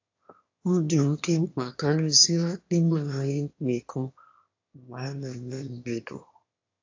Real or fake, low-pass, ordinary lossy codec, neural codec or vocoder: fake; 7.2 kHz; AAC, 48 kbps; autoencoder, 22.05 kHz, a latent of 192 numbers a frame, VITS, trained on one speaker